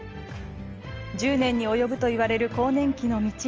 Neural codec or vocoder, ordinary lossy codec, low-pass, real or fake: none; Opus, 24 kbps; 7.2 kHz; real